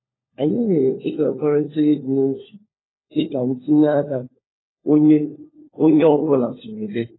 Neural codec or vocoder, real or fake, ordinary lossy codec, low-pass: codec, 16 kHz, 4 kbps, FunCodec, trained on LibriTTS, 50 frames a second; fake; AAC, 16 kbps; 7.2 kHz